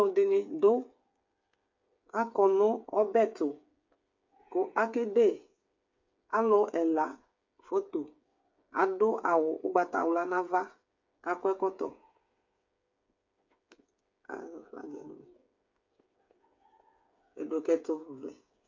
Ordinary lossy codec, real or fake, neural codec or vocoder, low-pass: MP3, 48 kbps; fake; codec, 16 kHz, 8 kbps, FreqCodec, smaller model; 7.2 kHz